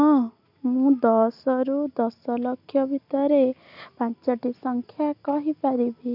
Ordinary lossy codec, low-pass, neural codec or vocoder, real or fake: none; 5.4 kHz; none; real